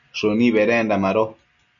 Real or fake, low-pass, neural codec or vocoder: real; 7.2 kHz; none